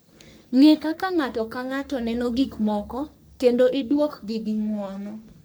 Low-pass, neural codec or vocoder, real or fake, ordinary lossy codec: none; codec, 44.1 kHz, 3.4 kbps, Pupu-Codec; fake; none